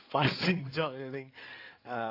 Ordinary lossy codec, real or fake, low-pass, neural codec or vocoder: AAC, 32 kbps; fake; 5.4 kHz; codec, 16 kHz in and 24 kHz out, 2.2 kbps, FireRedTTS-2 codec